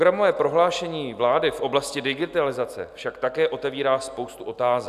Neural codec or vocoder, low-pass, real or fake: none; 14.4 kHz; real